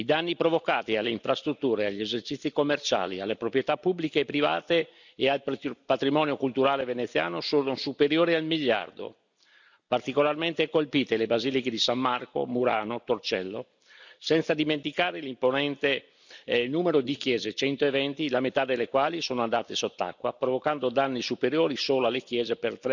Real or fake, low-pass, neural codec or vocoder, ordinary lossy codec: real; 7.2 kHz; none; none